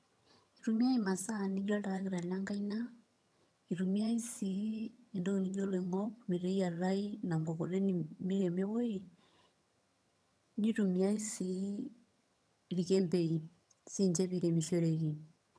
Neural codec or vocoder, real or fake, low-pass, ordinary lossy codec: vocoder, 22.05 kHz, 80 mel bands, HiFi-GAN; fake; none; none